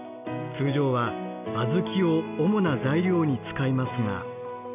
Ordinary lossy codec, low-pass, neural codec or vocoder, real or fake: none; 3.6 kHz; none; real